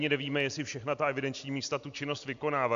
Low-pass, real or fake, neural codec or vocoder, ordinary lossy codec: 7.2 kHz; real; none; AAC, 64 kbps